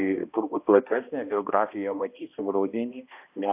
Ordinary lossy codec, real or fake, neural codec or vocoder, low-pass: AAC, 32 kbps; fake; codec, 16 kHz, 1 kbps, X-Codec, HuBERT features, trained on balanced general audio; 3.6 kHz